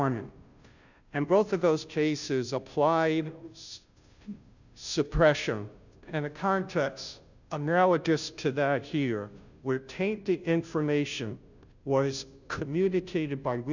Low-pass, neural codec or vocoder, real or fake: 7.2 kHz; codec, 16 kHz, 0.5 kbps, FunCodec, trained on Chinese and English, 25 frames a second; fake